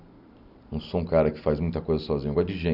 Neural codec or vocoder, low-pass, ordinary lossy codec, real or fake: none; 5.4 kHz; none; real